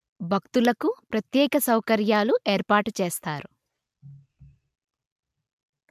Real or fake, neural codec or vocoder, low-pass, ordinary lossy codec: real; none; 14.4 kHz; MP3, 96 kbps